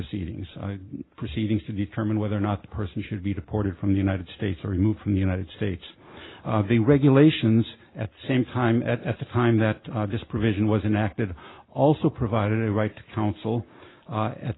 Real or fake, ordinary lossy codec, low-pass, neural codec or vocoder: real; AAC, 16 kbps; 7.2 kHz; none